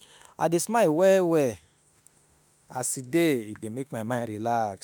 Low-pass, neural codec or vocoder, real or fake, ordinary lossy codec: none; autoencoder, 48 kHz, 32 numbers a frame, DAC-VAE, trained on Japanese speech; fake; none